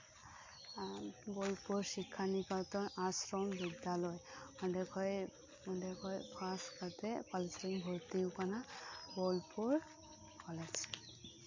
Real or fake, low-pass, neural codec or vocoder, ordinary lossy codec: real; 7.2 kHz; none; MP3, 48 kbps